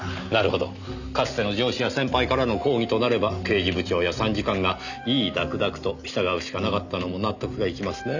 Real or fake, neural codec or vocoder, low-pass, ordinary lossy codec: real; none; 7.2 kHz; none